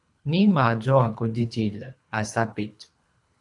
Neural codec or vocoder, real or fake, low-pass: codec, 24 kHz, 3 kbps, HILCodec; fake; 10.8 kHz